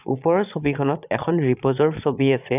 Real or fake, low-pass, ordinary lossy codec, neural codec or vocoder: fake; 3.6 kHz; none; vocoder, 22.05 kHz, 80 mel bands, Vocos